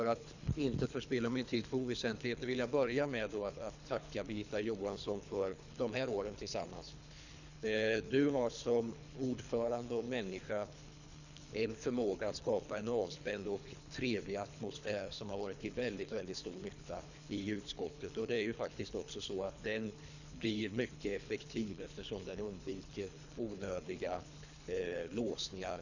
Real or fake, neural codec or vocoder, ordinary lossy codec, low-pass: fake; codec, 24 kHz, 3 kbps, HILCodec; none; 7.2 kHz